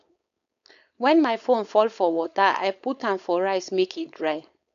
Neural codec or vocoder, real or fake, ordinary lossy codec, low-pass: codec, 16 kHz, 4.8 kbps, FACodec; fake; none; 7.2 kHz